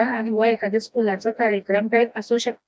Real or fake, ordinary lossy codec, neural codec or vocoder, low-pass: fake; none; codec, 16 kHz, 1 kbps, FreqCodec, smaller model; none